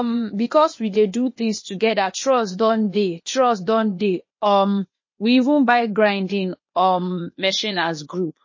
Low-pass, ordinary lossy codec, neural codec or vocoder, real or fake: 7.2 kHz; MP3, 32 kbps; codec, 16 kHz, 0.8 kbps, ZipCodec; fake